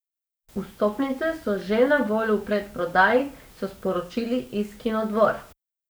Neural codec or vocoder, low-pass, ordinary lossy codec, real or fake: codec, 44.1 kHz, 7.8 kbps, DAC; none; none; fake